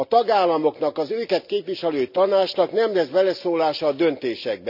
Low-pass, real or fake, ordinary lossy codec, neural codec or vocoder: 5.4 kHz; real; none; none